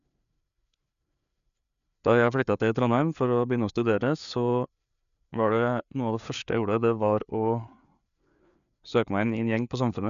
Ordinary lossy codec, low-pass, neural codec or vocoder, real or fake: none; 7.2 kHz; codec, 16 kHz, 4 kbps, FreqCodec, larger model; fake